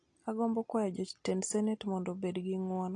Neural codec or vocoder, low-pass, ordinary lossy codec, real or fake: none; 9.9 kHz; AAC, 48 kbps; real